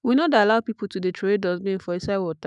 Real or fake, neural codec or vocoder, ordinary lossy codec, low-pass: fake; autoencoder, 48 kHz, 128 numbers a frame, DAC-VAE, trained on Japanese speech; none; 10.8 kHz